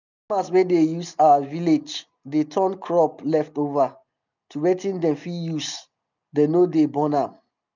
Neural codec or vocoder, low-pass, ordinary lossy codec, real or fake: none; 7.2 kHz; none; real